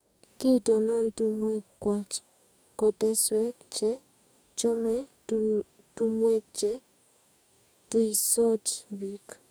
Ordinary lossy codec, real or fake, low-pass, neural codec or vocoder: none; fake; none; codec, 44.1 kHz, 2.6 kbps, DAC